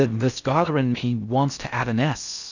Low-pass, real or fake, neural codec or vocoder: 7.2 kHz; fake; codec, 16 kHz in and 24 kHz out, 0.6 kbps, FocalCodec, streaming, 4096 codes